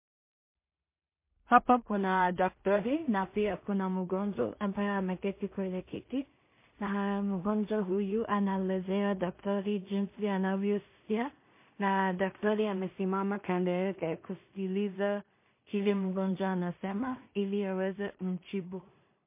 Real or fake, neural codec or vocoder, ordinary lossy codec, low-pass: fake; codec, 16 kHz in and 24 kHz out, 0.4 kbps, LongCat-Audio-Codec, two codebook decoder; MP3, 24 kbps; 3.6 kHz